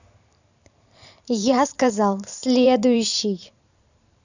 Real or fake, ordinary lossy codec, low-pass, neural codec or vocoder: fake; none; 7.2 kHz; vocoder, 44.1 kHz, 128 mel bands every 512 samples, BigVGAN v2